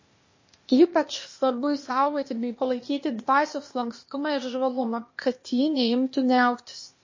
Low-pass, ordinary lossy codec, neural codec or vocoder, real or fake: 7.2 kHz; MP3, 32 kbps; codec, 16 kHz, 0.8 kbps, ZipCodec; fake